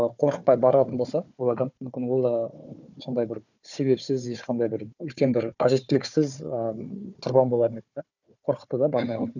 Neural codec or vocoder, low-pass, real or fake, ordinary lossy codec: codec, 16 kHz, 4 kbps, FunCodec, trained on Chinese and English, 50 frames a second; 7.2 kHz; fake; none